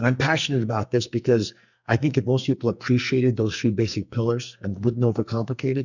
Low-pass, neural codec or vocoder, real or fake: 7.2 kHz; codec, 44.1 kHz, 2.6 kbps, SNAC; fake